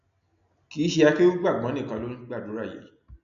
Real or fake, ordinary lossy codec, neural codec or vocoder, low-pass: real; none; none; 7.2 kHz